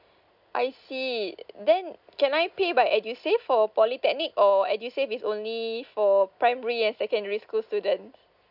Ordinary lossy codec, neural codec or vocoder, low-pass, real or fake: none; none; 5.4 kHz; real